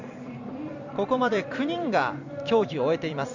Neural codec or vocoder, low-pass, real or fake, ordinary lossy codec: vocoder, 44.1 kHz, 128 mel bands every 256 samples, BigVGAN v2; 7.2 kHz; fake; none